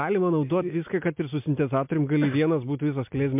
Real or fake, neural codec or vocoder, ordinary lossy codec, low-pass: real; none; MP3, 32 kbps; 3.6 kHz